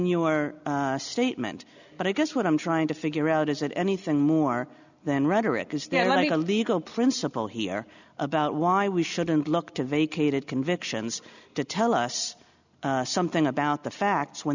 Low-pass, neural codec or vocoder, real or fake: 7.2 kHz; none; real